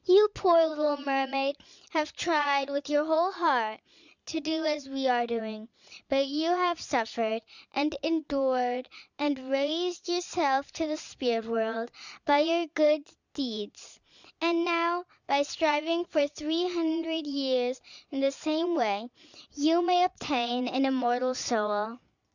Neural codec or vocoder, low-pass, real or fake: vocoder, 22.05 kHz, 80 mel bands, Vocos; 7.2 kHz; fake